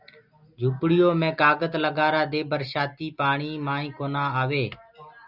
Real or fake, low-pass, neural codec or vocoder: real; 5.4 kHz; none